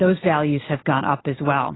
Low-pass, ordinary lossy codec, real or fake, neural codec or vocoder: 7.2 kHz; AAC, 16 kbps; real; none